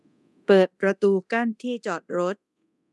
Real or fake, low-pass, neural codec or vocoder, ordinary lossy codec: fake; none; codec, 24 kHz, 0.9 kbps, DualCodec; none